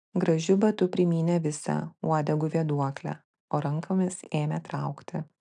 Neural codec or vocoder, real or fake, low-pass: none; real; 10.8 kHz